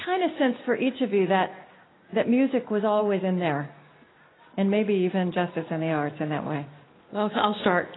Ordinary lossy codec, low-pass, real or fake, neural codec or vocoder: AAC, 16 kbps; 7.2 kHz; real; none